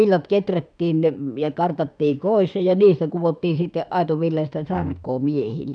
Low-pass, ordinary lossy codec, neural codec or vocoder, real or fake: 9.9 kHz; none; autoencoder, 48 kHz, 32 numbers a frame, DAC-VAE, trained on Japanese speech; fake